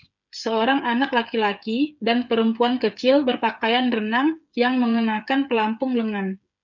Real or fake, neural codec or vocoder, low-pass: fake; codec, 16 kHz, 8 kbps, FreqCodec, smaller model; 7.2 kHz